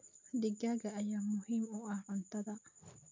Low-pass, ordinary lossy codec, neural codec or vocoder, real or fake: 7.2 kHz; MP3, 48 kbps; none; real